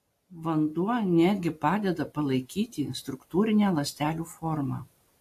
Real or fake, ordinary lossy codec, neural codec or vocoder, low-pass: real; AAC, 64 kbps; none; 14.4 kHz